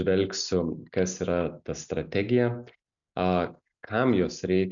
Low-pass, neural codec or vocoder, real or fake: 7.2 kHz; none; real